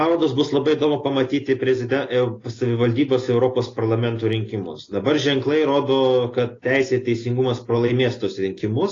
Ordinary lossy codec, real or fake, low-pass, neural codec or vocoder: AAC, 32 kbps; real; 7.2 kHz; none